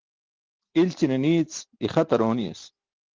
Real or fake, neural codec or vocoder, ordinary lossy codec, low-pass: real; none; Opus, 16 kbps; 7.2 kHz